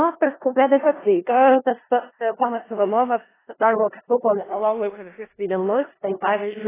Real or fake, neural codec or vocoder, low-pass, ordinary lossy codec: fake; codec, 16 kHz in and 24 kHz out, 0.4 kbps, LongCat-Audio-Codec, four codebook decoder; 3.6 kHz; AAC, 16 kbps